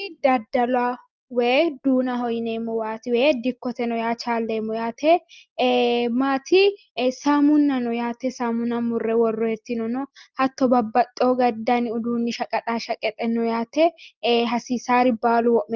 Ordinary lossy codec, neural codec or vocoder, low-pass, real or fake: Opus, 24 kbps; none; 7.2 kHz; real